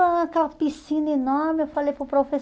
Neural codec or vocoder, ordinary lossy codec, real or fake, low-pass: none; none; real; none